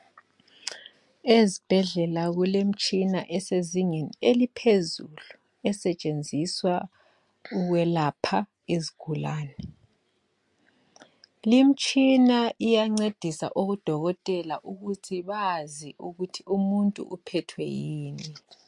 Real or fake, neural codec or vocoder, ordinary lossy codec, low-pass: real; none; MP3, 64 kbps; 10.8 kHz